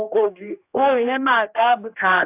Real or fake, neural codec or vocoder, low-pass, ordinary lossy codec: fake; codec, 16 kHz, 1 kbps, X-Codec, HuBERT features, trained on general audio; 3.6 kHz; AAC, 24 kbps